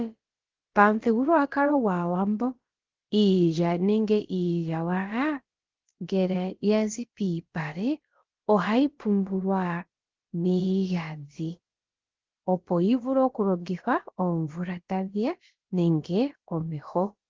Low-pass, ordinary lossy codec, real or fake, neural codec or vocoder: 7.2 kHz; Opus, 16 kbps; fake; codec, 16 kHz, about 1 kbps, DyCAST, with the encoder's durations